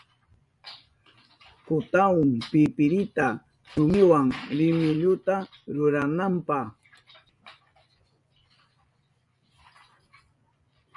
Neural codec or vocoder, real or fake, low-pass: vocoder, 24 kHz, 100 mel bands, Vocos; fake; 10.8 kHz